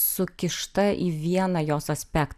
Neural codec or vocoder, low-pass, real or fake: none; 14.4 kHz; real